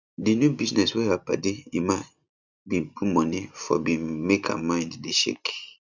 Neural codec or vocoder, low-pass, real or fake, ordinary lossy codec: vocoder, 44.1 kHz, 128 mel bands every 256 samples, BigVGAN v2; 7.2 kHz; fake; none